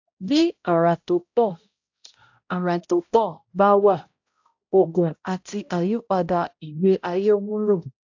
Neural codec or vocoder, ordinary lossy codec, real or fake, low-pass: codec, 16 kHz, 0.5 kbps, X-Codec, HuBERT features, trained on balanced general audio; AAC, 48 kbps; fake; 7.2 kHz